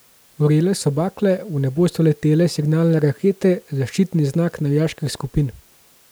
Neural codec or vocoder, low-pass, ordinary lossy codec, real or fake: none; none; none; real